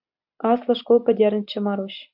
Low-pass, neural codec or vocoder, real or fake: 5.4 kHz; none; real